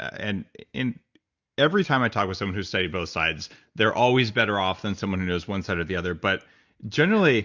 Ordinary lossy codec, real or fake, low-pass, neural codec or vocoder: Opus, 64 kbps; real; 7.2 kHz; none